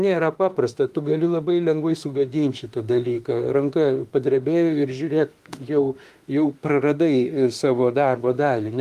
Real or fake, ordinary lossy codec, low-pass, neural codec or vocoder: fake; Opus, 32 kbps; 14.4 kHz; autoencoder, 48 kHz, 32 numbers a frame, DAC-VAE, trained on Japanese speech